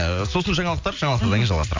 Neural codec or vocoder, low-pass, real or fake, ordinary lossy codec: none; 7.2 kHz; real; MP3, 64 kbps